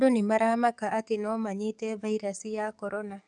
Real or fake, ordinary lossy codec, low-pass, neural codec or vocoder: fake; none; 10.8 kHz; codec, 44.1 kHz, 7.8 kbps, DAC